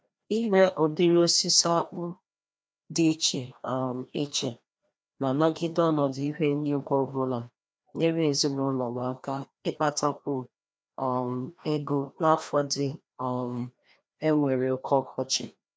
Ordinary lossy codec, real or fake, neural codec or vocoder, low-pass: none; fake; codec, 16 kHz, 1 kbps, FreqCodec, larger model; none